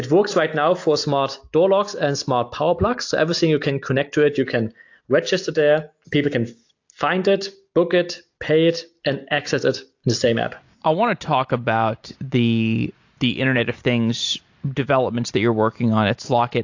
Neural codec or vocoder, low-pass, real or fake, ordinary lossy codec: none; 7.2 kHz; real; AAC, 48 kbps